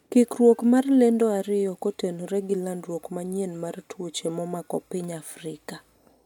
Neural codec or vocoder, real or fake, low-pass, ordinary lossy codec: none; real; 19.8 kHz; MP3, 96 kbps